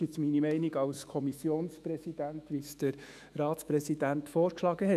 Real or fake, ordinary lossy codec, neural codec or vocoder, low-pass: fake; none; autoencoder, 48 kHz, 128 numbers a frame, DAC-VAE, trained on Japanese speech; 14.4 kHz